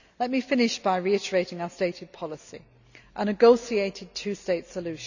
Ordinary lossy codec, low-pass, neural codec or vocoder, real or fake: none; 7.2 kHz; none; real